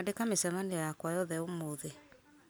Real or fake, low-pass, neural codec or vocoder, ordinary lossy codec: real; none; none; none